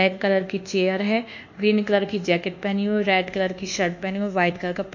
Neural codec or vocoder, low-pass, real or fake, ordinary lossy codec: codec, 24 kHz, 1.2 kbps, DualCodec; 7.2 kHz; fake; none